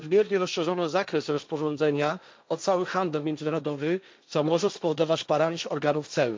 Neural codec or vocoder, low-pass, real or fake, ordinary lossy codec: codec, 16 kHz, 1.1 kbps, Voila-Tokenizer; none; fake; none